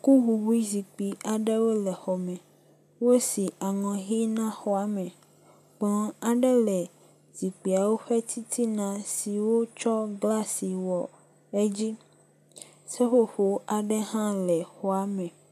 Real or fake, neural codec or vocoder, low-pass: real; none; 14.4 kHz